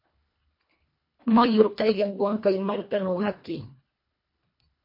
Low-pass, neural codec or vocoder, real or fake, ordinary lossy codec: 5.4 kHz; codec, 24 kHz, 1.5 kbps, HILCodec; fake; MP3, 32 kbps